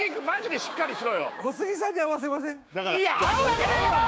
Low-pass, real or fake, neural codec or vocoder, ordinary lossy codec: none; fake; codec, 16 kHz, 6 kbps, DAC; none